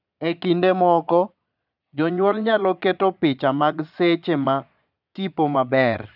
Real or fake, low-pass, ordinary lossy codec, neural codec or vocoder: fake; 5.4 kHz; none; vocoder, 22.05 kHz, 80 mel bands, Vocos